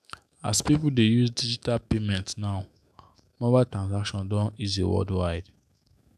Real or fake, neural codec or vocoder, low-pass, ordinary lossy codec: fake; autoencoder, 48 kHz, 128 numbers a frame, DAC-VAE, trained on Japanese speech; 14.4 kHz; none